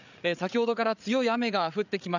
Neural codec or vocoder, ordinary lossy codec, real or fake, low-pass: codec, 16 kHz, 8 kbps, FreqCodec, larger model; none; fake; 7.2 kHz